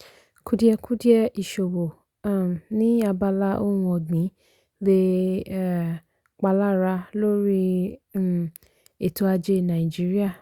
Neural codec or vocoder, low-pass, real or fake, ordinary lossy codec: none; 19.8 kHz; real; Opus, 64 kbps